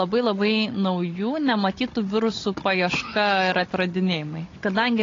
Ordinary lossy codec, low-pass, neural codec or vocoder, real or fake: AAC, 32 kbps; 7.2 kHz; codec, 16 kHz, 16 kbps, FunCodec, trained on Chinese and English, 50 frames a second; fake